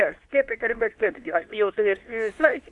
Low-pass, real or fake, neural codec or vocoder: 10.8 kHz; fake; codec, 24 kHz, 0.9 kbps, WavTokenizer, medium speech release version 2